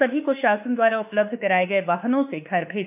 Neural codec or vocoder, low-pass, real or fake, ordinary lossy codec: autoencoder, 48 kHz, 32 numbers a frame, DAC-VAE, trained on Japanese speech; 3.6 kHz; fake; none